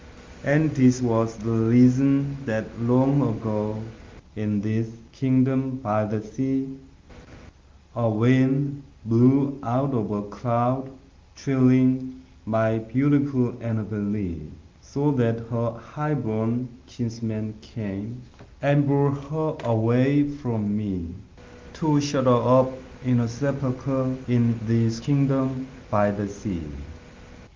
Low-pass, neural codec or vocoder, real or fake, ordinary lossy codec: 7.2 kHz; none; real; Opus, 32 kbps